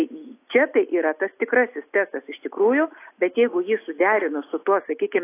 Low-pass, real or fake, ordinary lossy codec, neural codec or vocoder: 3.6 kHz; real; AAC, 24 kbps; none